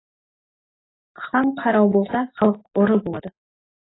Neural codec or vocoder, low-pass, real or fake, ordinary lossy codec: none; 7.2 kHz; real; AAC, 16 kbps